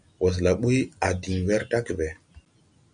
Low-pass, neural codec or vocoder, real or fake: 9.9 kHz; none; real